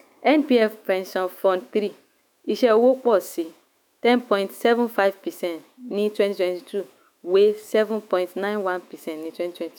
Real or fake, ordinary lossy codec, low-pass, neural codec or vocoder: fake; none; 19.8 kHz; autoencoder, 48 kHz, 128 numbers a frame, DAC-VAE, trained on Japanese speech